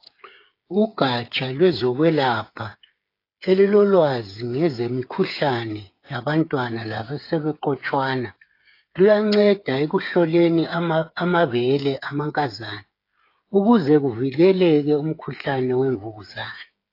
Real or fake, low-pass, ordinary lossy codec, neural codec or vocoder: fake; 5.4 kHz; AAC, 32 kbps; codec, 16 kHz, 8 kbps, FreqCodec, smaller model